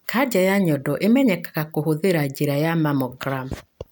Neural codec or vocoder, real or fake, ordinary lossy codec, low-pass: none; real; none; none